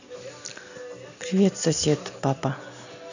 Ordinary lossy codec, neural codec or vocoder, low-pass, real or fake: none; none; 7.2 kHz; real